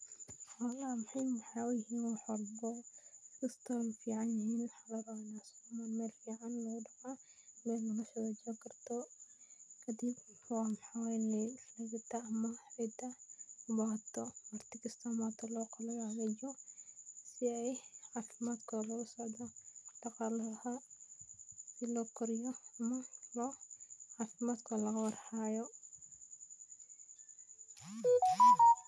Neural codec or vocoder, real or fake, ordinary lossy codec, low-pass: none; real; none; none